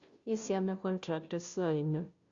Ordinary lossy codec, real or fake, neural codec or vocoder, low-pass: Opus, 64 kbps; fake; codec, 16 kHz, 0.5 kbps, FunCodec, trained on Chinese and English, 25 frames a second; 7.2 kHz